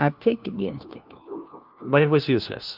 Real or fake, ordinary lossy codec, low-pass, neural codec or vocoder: fake; Opus, 32 kbps; 5.4 kHz; codec, 16 kHz, 1 kbps, FunCodec, trained on LibriTTS, 50 frames a second